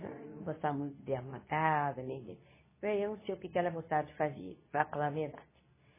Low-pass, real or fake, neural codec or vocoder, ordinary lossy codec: 3.6 kHz; fake; codec, 24 kHz, 0.9 kbps, WavTokenizer, medium speech release version 2; MP3, 16 kbps